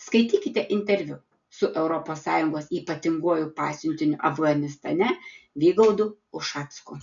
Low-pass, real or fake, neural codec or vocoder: 7.2 kHz; real; none